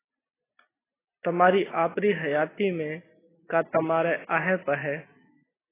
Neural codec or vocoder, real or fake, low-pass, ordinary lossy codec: none; real; 3.6 kHz; AAC, 16 kbps